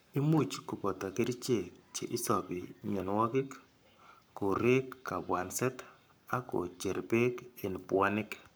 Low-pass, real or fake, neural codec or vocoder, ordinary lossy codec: none; fake; codec, 44.1 kHz, 7.8 kbps, Pupu-Codec; none